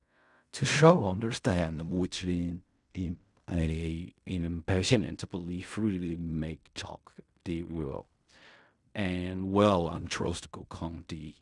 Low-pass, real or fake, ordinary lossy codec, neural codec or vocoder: 10.8 kHz; fake; none; codec, 16 kHz in and 24 kHz out, 0.4 kbps, LongCat-Audio-Codec, fine tuned four codebook decoder